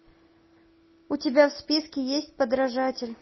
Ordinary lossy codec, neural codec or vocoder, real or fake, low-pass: MP3, 24 kbps; none; real; 7.2 kHz